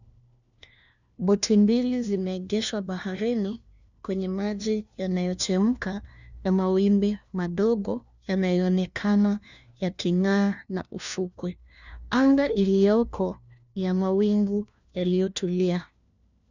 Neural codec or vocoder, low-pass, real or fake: codec, 16 kHz, 1 kbps, FunCodec, trained on LibriTTS, 50 frames a second; 7.2 kHz; fake